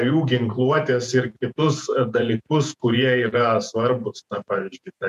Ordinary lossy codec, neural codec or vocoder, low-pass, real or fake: MP3, 96 kbps; vocoder, 48 kHz, 128 mel bands, Vocos; 14.4 kHz; fake